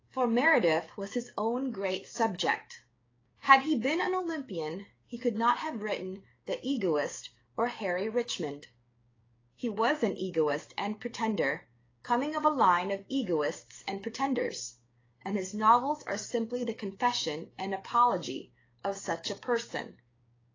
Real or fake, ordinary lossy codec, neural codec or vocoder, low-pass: fake; AAC, 32 kbps; codec, 16 kHz, 6 kbps, DAC; 7.2 kHz